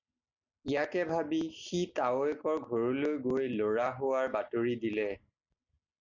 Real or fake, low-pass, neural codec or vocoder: real; 7.2 kHz; none